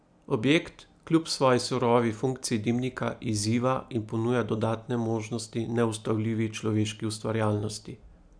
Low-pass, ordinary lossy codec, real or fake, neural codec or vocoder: 9.9 kHz; none; real; none